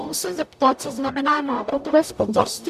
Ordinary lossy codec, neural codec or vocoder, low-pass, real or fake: MP3, 96 kbps; codec, 44.1 kHz, 0.9 kbps, DAC; 14.4 kHz; fake